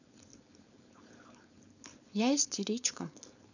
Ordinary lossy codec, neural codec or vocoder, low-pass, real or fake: none; codec, 16 kHz, 4.8 kbps, FACodec; 7.2 kHz; fake